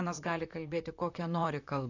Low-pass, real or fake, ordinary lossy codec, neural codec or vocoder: 7.2 kHz; fake; AAC, 48 kbps; vocoder, 44.1 kHz, 128 mel bands, Pupu-Vocoder